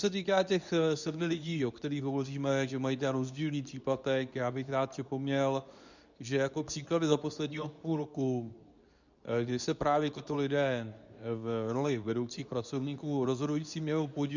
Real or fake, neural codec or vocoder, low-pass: fake; codec, 24 kHz, 0.9 kbps, WavTokenizer, medium speech release version 2; 7.2 kHz